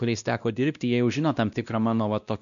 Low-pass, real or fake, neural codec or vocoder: 7.2 kHz; fake; codec, 16 kHz, 1 kbps, X-Codec, WavLM features, trained on Multilingual LibriSpeech